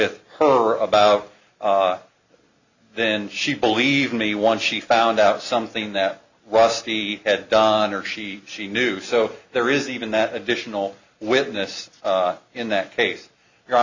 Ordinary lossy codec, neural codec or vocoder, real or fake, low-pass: Opus, 64 kbps; none; real; 7.2 kHz